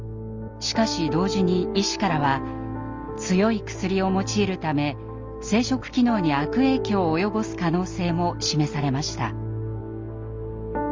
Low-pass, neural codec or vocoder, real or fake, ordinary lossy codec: 7.2 kHz; none; real; Opus, 32 kbps